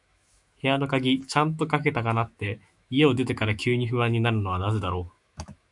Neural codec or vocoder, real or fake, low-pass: autoencoder, 48 kHz, 128 numbers a frame, DAC-VAE, trained on Japanese speech; fake; 10.8 kHz